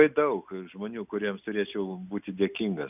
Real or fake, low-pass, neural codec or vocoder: real; 3.6 kHz; none